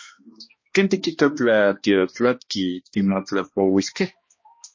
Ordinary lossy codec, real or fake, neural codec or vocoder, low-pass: MP3, 32 kbps; fake; codec, 16 kHz, 1 kbps, X-Codec, HuBERT features, trained on balanced general audio; 7.2 kHz